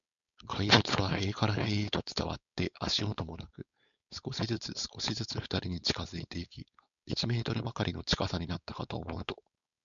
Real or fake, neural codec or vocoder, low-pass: fake; codec, 16 kHz, 4.8 kbps, FACodec; 7.2 kHz